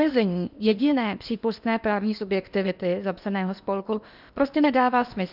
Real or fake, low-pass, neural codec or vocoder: fake; 5.4 kHz; codec, 16 kHz in and 24 kHz out, 0.8 kbps, FocalCodec, streaming, 65536 codes